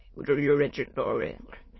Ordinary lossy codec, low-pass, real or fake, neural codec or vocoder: MP3, 24 kbps; 7.2 kHz; fake; autoencoder, 22.05 kHz, a latent of 192 numbers a frame, VITS, trained on many speakers